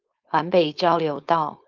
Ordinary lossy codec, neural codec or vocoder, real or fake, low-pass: Opus, 24 kbps; codec, 16 kHz, 4.8 kbps, FACodec; fake; 7.2 kHz